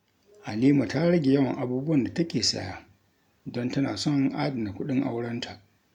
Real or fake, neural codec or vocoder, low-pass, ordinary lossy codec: real; none; 19.8 kHz; none